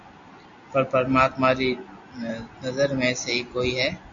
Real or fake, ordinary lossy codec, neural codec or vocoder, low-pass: real; AAC, 64 kbps; none; 7.2 kHz